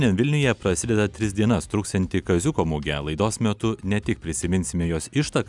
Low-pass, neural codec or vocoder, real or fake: 10.8 kHz; none; real